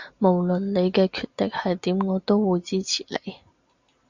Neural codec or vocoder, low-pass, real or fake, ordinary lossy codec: none; 7.2 kHz; real; Opus, 64 kbps